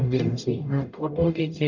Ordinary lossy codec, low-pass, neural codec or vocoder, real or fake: none; 7.2 kHz; codec, 44.1 kHz, 0.9 kbps, DAC; fake